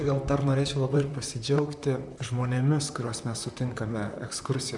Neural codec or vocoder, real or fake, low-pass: vocoder, 44.1 kHz, 128 mel bands, Pupu-Vocoder; fake; 10.8 kHz